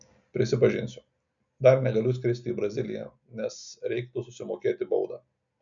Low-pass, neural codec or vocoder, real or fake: 7.2 kHz; none; real